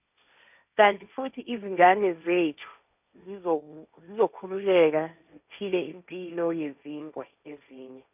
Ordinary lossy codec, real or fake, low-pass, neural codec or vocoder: none; fake; 3.6 kHz; codec, 16 kHz, 1.1 kbps, Voila-Tokenizer